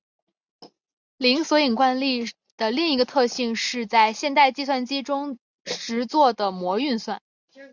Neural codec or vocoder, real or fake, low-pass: none; real; 7.2 kHz